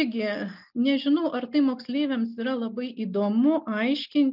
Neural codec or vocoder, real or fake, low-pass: none; real; 5.4 kHz